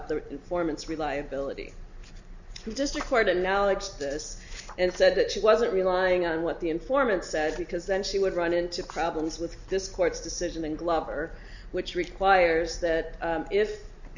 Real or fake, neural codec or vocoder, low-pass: real; none; 7.2 kHz